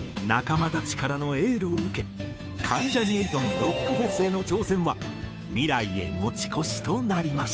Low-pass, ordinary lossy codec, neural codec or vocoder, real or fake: none; none; codec, 16 kHz, 2 kbps, FunCodec, trained on Chinese and English, 25 frames a second; fake